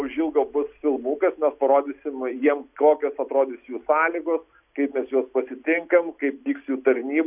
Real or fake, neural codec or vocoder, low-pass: real; none; 3.6 kHz